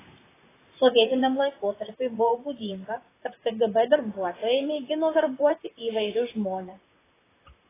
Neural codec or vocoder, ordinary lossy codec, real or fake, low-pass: vocoder, 44.1 kHz, 128 mel bands, Pupu-Vocoder; AAC, 16 kbps; fake; 3.6 kHz